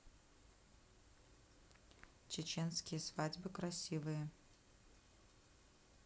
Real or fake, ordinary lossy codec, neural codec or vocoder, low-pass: real; none; none; none